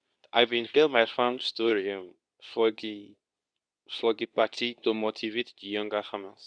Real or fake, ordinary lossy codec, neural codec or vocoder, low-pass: fake; none; codec, 24 kHz, 0.9 kbps, WavTokenizer, medium speech release version 2; 9.9 kHz